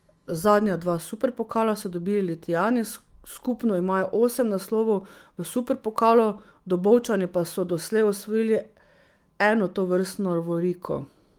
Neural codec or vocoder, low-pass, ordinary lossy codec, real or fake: autoencoder, 48 kHz, 128 numbers a frame, DAC-VAE, trained on Japanese speech; 19.8 kHz; Opus, 24 kbps; fake